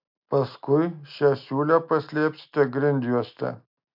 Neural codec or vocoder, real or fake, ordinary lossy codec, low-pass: none; real; MP3, 48 kbps; 5.4 kHz